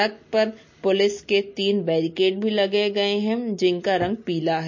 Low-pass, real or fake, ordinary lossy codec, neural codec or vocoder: 7.2 kHz; real; MP3, 32 kbps; none